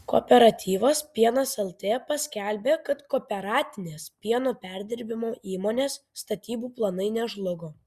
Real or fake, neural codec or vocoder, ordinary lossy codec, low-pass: real; none; Opus, 64 kbps; 14.4 kHz